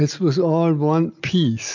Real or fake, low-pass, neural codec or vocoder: real; 7.2 kHz; none